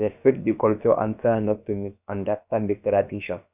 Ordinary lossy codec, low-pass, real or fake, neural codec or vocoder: none; 3.6 kHz; fake; codec, 16 kHz, about 1 kbps, DyCAST, with the encoder's durations